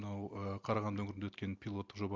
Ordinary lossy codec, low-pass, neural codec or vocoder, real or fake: Opus, 32 kbps; 7.2 kHz; none; real